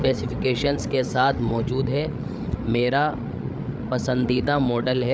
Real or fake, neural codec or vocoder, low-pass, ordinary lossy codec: fake; codec, 16 kHz, 8 kbps, FreqCodec, larger model; none; none